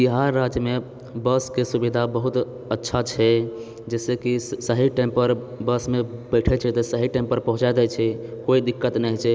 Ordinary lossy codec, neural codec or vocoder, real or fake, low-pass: none; none; real; none